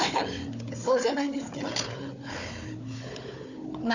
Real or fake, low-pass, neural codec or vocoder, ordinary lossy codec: fake; 7.2 kHz; codec, 16 kHz, 4 kbps, FunCodec, trained on Chinese and English, 50 frames a second; none